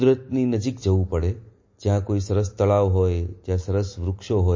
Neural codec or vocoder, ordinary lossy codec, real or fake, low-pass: none; MP3, 32 kbps; real; 7.2 kHz